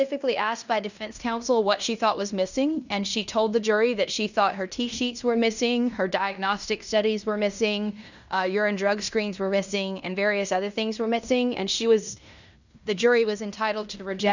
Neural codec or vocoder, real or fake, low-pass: codec, 16 kHz in and 24 kHz out, 0.9 kbps, LongCat-Audio-Codec, fine tuned four codebook decoder; fake; 7.2 kHz